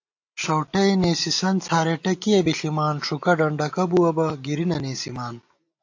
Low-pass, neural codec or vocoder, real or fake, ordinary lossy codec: 7.2 kHz; none; real; AAC, 48 kbps